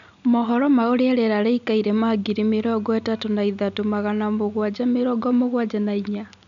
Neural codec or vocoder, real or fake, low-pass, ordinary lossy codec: none; real; 7.2 kHz; none